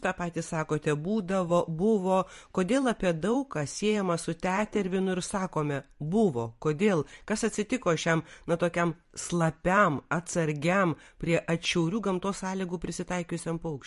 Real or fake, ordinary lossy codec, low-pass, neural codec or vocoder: fake; MP3, 48 kbps; 14.4 kHz; vocoder, 44.1 kHz, 128 mel bands every 512 samples, BigVGAN v2